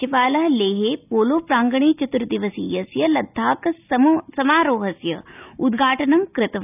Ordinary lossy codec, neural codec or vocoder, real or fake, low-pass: none; none; real; 3.6 kHz